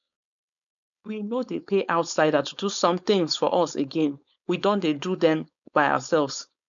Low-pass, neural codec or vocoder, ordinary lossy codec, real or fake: 7.2 kHz; codec, 16 kHz, 4.8 kbps, FACodec; none; fake